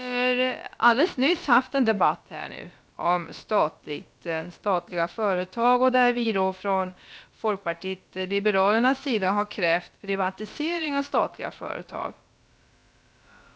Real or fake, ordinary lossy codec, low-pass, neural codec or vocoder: fake; none; none; codec, 16 kHz, about 1 kbps, DyCAST, with the encoder's durations